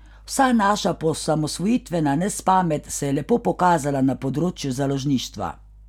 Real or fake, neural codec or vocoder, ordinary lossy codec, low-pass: real; none; none; 19.8 kHz